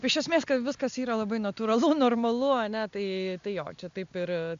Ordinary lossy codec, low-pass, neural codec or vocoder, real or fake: MP3, 64 kbps; 7.2 kHz; none; real